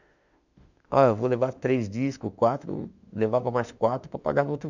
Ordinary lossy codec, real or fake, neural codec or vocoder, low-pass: none; fake; autoencoder, 48 kHz, 32 numbers a frame, DAC-VAE, trained on Japanese speech; 7.2 kHz